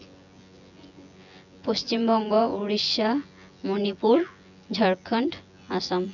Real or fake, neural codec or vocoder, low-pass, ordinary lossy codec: fake; vocoder, 24 kHz, 100 mel bands, Vocos; 7.2 kHz; none